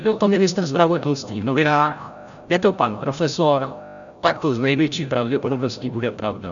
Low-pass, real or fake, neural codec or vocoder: 7.2 kHz; fake; codec, 16 kHz, 0.5 kbps, FreqCodec, larger model